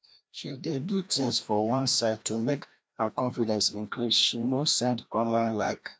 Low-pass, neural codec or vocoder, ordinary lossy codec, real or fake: none; codec, 16 kHz, 1 kbps, FreqCodec, larger model; none; fake